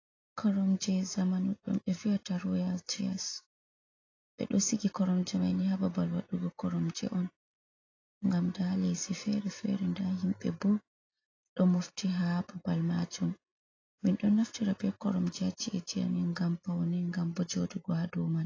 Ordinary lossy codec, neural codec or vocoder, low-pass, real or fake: AAC, 32 kbps; none; 7.2 kHz; real